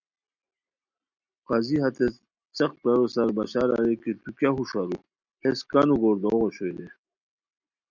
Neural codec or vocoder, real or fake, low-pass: none; real; 7.2 kHz